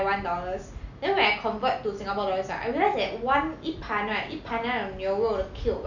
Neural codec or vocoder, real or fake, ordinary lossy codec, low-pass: none; real; none; 7.2 kHz